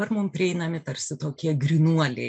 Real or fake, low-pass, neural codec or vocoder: real; 9.9 kHz; none